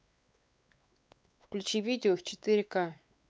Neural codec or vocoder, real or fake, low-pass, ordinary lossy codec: codec, 16 kHz, 4 kbps, X-Codec, WavLM features, trained on Multilingual LibriSpeech; fake; none; none